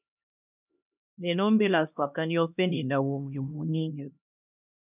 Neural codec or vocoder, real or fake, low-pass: codec, 16 kHz, 1 kbps, X-Codec, HuBERT features, trained on LibriSpeech; fake; 3.6 kHz